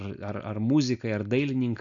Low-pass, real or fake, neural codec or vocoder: 7.2 kHz; real; none